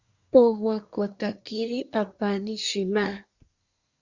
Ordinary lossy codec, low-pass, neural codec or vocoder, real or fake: Opus, 64 kbps; 7.2 kHz; codec, 24 kHz, 1 kbps, SNAC; fake